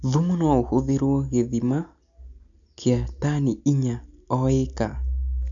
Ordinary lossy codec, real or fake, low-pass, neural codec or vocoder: none; real; 7.2 kHz; none